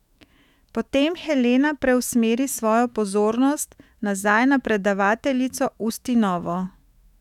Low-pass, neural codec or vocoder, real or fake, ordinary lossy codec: 19.8 kHz; autoencoder, 48 kHz, 128 numbers a frame, DAC-VAE, trained on Japanese speech; fake; none